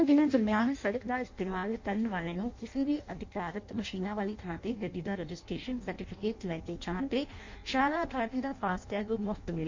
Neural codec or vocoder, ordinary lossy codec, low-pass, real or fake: codec, 16 kHz in and 24 kHz out, 0.6 kbps, FireRedTTS-2 codec; MP3, 48 kbps; 7.2 kHz; fake